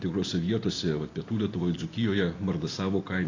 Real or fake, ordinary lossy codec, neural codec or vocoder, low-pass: real; AAC, 32 kbps; none; 7.2 kHz